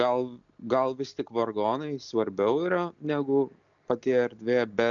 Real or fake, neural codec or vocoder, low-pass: real; none; 7.2 kHz